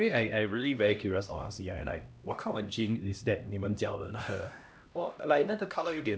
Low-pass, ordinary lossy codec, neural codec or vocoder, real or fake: none; none; codec, 16 kHz, 1 kbps, X-Codec, HuBERT features, trained on LibriSpeech; fake